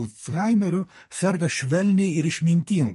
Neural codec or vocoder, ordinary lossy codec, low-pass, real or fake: codec, 44.1 kHz, 2.6 kbps, SNAC; MP3, 48 kbps; 14.4 kHz; fake